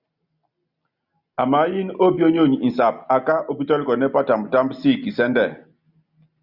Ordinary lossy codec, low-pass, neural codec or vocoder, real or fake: Opus, 64 kbps; 5.4 kHz; none; real